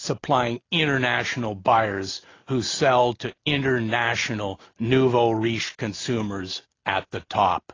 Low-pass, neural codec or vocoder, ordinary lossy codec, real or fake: 7.2 kHz; none; AAC, 32 kbps; real